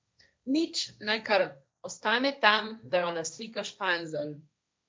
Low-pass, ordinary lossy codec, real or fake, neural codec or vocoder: none; none; fake; codec, 16 kHz, 1.1 kbps, Voila-Tokenizer